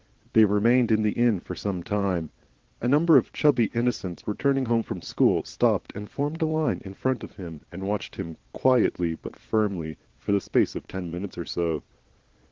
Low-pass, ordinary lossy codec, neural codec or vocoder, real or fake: 7.2 kHz; Opus, 16 kbps; vocoder, 22.05 kHz, 80 mel bands, Vocos; fake